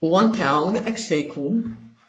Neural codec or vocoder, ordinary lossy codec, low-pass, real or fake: codec, 44.1 kHz, 3.4 kbps, Pupu-Codec; AAC, 48 kbps; 9.9 kHz; fake